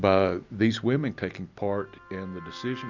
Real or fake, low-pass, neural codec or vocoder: real; 7.2 kHz; none